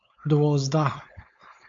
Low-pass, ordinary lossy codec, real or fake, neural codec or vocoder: 7.2 kHz; AAC, 48 kbps; fake; codec, 16 kHz, 4.8 kbps, FACodec